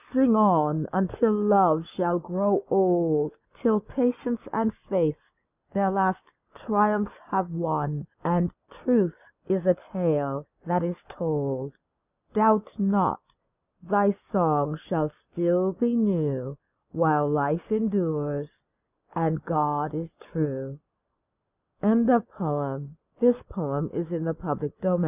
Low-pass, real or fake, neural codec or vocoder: 3.6 kHz; real; none